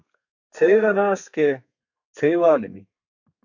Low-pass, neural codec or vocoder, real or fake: 7.2 kHz; codec, 32 kHz, 1.9 kbps, SNAC; fake